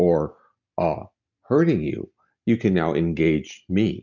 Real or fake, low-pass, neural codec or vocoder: real; 7.2 kHz; none